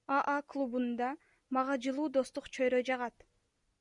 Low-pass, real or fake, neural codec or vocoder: 10.8 kHz; real; none